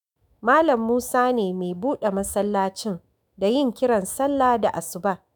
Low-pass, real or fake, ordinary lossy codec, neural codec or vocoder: none; fake; none; autoencoder, 48 kHz, 128 numbers a frame, DAC-VAE, trained on Japanese speech